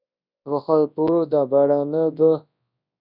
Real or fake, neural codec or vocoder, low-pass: fake; codec, 24 kHz, 0.9 kbps, WavTokenizer, large speech release; 5.4 kHz